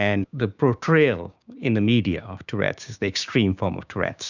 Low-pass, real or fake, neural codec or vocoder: 7.2 kHz; fake; codec, 16 kHz, 6 kbps, DAC